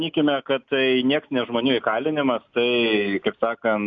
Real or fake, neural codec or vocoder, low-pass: real; none; 7.2 kHz